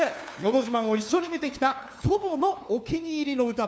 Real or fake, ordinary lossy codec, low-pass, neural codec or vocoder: fake; none; none; codec, 16 kHz, 2 kbps, FunCodec, trained on LibriTTS, 25 frames a second